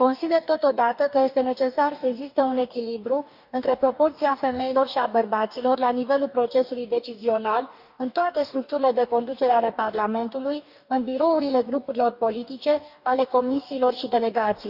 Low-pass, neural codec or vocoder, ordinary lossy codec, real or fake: 5.4 kHz; codec, 44.1 kHz, 2.6 kbps, DAC; none; fake